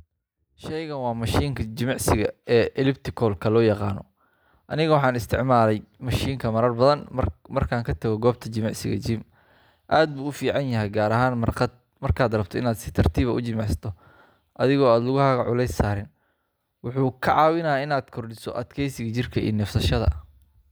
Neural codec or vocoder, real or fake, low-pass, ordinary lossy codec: none; real; none; none